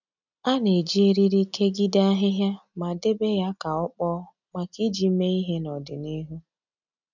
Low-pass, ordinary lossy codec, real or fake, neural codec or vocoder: 7.2 kHz; none; real; none